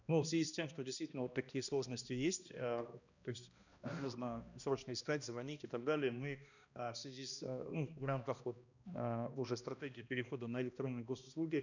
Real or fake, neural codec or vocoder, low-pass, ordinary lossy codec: fake; codec, 16 kHz, 1 kbps, X-Codec, HuBERT features, trained on balanced general audio; 7.2 kHz; none